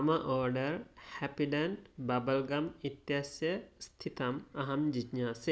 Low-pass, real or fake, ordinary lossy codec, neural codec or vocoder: none; real; none; none